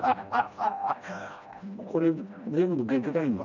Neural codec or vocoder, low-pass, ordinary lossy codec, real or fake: codec, 16 kHz, 1 kbps, FreqCodec, smaller model; 7.2 kHz; none; fake